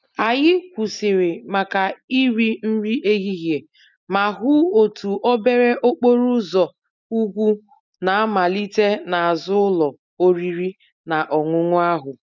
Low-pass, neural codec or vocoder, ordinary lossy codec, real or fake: 7.2 kHz; none; none; real